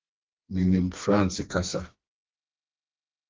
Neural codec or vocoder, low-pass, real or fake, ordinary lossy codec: codec, 16 kHz, 2 kbps, FreqCodec, smaller model; 7.2 kHz; fake; Opus, 32 kbps